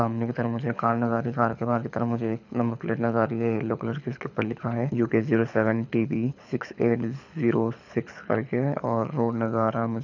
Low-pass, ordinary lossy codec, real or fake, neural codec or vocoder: 7.2 kHz; none; fake; codec, 24 kHz, 6 kbps, HILCodec